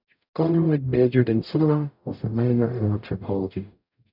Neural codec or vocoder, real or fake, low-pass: codec, 44.1 kHz, 0.9 kbps, DAC; fake; 5.4 kHz